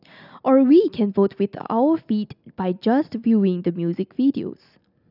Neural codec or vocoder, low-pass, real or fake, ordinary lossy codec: none; 5.4 kHz; real; none